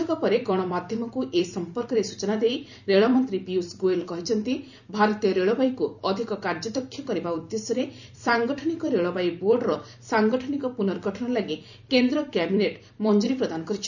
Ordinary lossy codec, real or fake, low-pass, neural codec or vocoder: none; fake; 7.2 kHz; vocoder, 44.1 kHz, 128 mel bands every 256 samples, BigVGAN v2